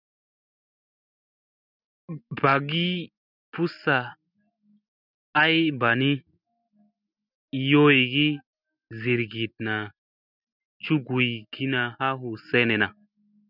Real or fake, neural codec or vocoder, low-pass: real; none; 5.4 kHz